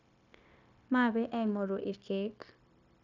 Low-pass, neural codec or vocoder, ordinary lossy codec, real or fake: 7.2 kHz; codec, 16 kHz, 0.9 kbps, LongCat-Audio-Codec; none; fake